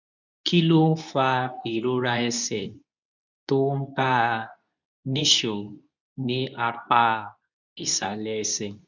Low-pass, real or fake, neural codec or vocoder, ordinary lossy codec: 7.2 kHz; fake; codec, 24 kHz, 0.9 kbps, WavTokenizer, medium speech release version 2; none